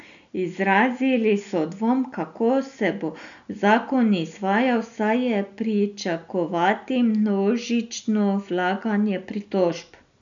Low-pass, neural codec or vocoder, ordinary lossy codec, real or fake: 7.2 kHz; none; none; real